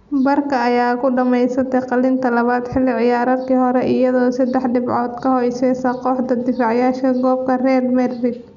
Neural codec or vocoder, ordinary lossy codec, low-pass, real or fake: none; none; 7.2 kHz; real